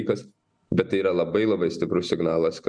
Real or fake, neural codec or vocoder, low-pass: real; none; 9.9 kHz